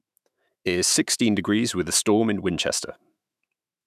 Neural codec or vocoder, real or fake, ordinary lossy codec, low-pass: autoencoder, 48 kHz, 128 numbers a frame, DAC-VAE, trained on Japanese speech; fake; none; 14.4 kHz